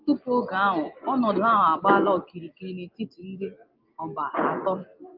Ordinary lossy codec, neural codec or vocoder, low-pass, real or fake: Opus, 32 kbps; none; 5.4 kHz; real